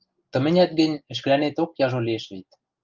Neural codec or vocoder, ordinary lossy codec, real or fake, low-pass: none; Opus, 24 kbps; real; 7.2 kHz